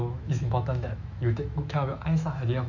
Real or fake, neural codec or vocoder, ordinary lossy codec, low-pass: fake; autoencoder, 48 kHz, 128 numbers a frame, DAC-VAE, trained on Japanese speech; none; 7.2 kHz